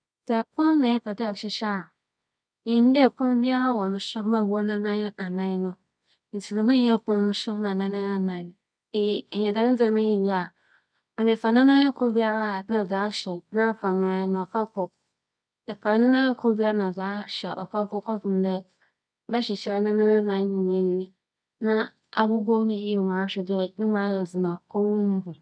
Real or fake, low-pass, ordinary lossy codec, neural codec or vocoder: fake; 9.9 kHz; none; codec, 24 kHz, 0.9 kbps, WavTokenizer, medium music audio release